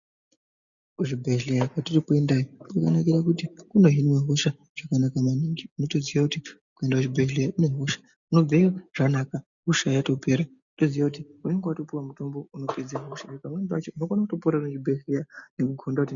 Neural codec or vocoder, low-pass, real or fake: none; 7.2 kHz; real